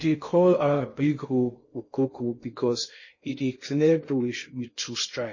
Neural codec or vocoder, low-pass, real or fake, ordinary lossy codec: codec, 16 kHz in and 24 kHz out, 0.6 kbps, FocalCodec, streaming, 2048 codes; 7.2 kHz; fake; MP3, 32 kbps